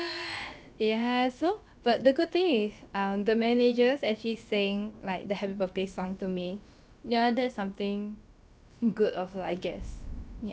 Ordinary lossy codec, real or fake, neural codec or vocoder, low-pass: none; fake; codec, 16 kHz, about 1 kbps, DyCAST, with the encoder's durations; none